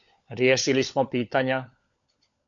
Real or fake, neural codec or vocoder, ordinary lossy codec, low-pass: fake; codec, 16 kHz, 16 kbps, FunCodec, trained on LibriTTS, 50 frames a second; AAC, 64 kbps; 7.2 kHz